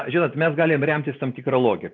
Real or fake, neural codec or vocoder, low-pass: real; none; 7.2 kHz